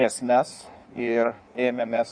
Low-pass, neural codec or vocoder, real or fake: 9.9 kHz; codec, 16 kHz in and 24 kHz out, 1.1 kbps, FireRedTTS-2 codec; fake